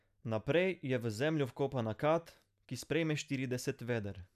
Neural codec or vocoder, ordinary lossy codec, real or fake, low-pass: none; none; real; 14.4 kHz